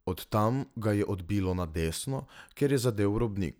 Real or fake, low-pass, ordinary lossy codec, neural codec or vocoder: real; none; none; none